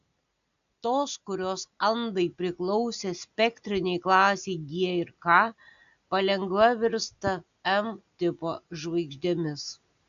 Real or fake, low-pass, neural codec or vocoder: real; 7.2 kHz; none